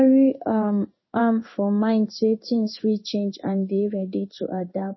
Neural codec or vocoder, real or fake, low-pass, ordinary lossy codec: codec, 16 kHz in and 24 kHz out, 1 kbps, XY-Tokenizer; fake; 7.2 kHz; MP3, 24 kbps